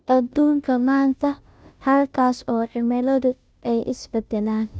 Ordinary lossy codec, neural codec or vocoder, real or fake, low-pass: none; codec, 16 kHz, 0.5 kbps, FunCodec, trained on Chinese and English, 25 frames a second; fake; none